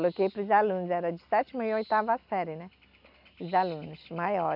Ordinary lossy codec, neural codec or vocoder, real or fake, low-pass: none; none; real; 5.4 kHz